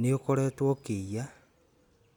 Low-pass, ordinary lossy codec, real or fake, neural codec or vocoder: 19.8 kHz; none; real; none